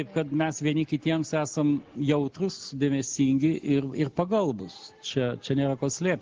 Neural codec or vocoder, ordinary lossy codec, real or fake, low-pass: none; Opus, 16 kbps; real; 7.2 kHz